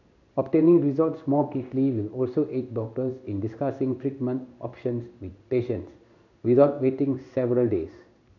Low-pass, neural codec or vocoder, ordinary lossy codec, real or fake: 7.2 kHz; codec, 16 kHz in and 24 kHz out, 1 kbps, XY-Tokenizer; none; fake